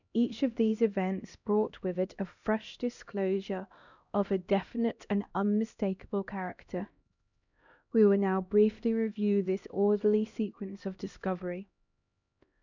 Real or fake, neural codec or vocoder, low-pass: fake; codec, 16 kHz, 1 kbps, X-Codec, HuBERT features, trained on LibriSpeech; 7.2 kHz